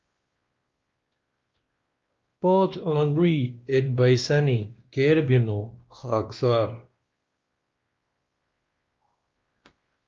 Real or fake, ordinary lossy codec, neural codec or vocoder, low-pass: fake; Opus, 32 kbps; codec, 16 kHz, 1 kbps, X-Codec, WavLM features, trained on Multilingual LibriSpeech; 7.2 kHz